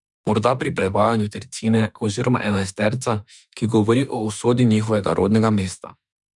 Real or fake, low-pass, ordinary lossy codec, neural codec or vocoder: fake; 10.8 kHz; none; autoencoder, 48 kHz, 32 numbers a frame, DAC-VAE, trained on Japanese speech